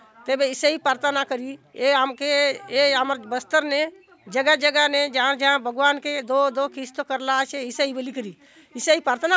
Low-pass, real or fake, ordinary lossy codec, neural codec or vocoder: none; real; none; none